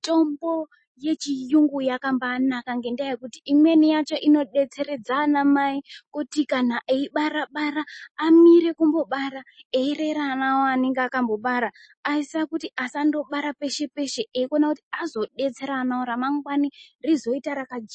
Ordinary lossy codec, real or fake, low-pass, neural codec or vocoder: MP3, 32 kbps; real; 9.9 kHz; none